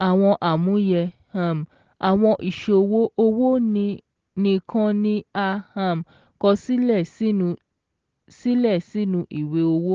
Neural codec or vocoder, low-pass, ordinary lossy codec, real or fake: none; 7.2 kHz; Opus, 16 kbps; real